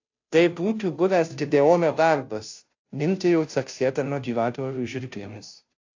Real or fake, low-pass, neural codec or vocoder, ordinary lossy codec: fake; 7.2 kHz; codec, 16 kHz, 0.5 kbps, FunCodec, trained on Chinese and English, 25 frames a second; AAC, 48 kbps